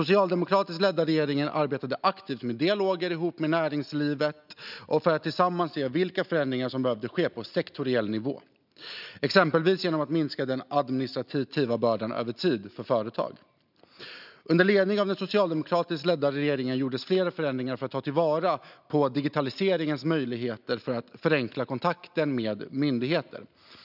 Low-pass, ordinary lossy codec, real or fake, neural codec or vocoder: 5.4 kHz; none; real; none